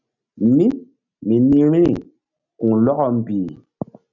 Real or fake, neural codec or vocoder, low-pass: real; none; 7.2 kHz